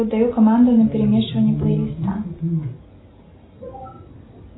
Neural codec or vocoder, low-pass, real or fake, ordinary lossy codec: none; 7.2 kHz; real; AAC, 16 kbps